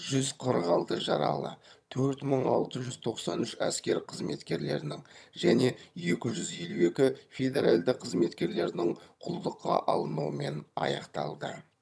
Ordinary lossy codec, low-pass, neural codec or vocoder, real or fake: none; none; vocoder, 22.05 kHz, 80 mel bands, HiFi-GAN; fake